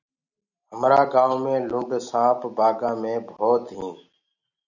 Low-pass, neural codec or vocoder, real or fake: 7.2 kHz; none; real